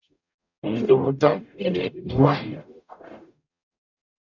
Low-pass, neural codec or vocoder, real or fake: 7.2 kHz; codec, 44.1 kHz, 0.9 kbps, DAC; fake